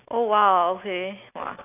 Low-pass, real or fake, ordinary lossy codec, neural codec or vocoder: 3.6 kHz; real; none; none